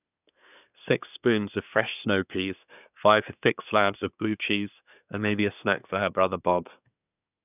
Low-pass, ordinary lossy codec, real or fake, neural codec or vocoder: 3.6 kHz; none; fake; codec, 24 kHz, 1 kbps, SNAC